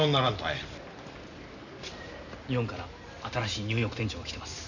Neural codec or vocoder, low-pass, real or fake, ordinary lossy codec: none; 7.2 kHz; real; none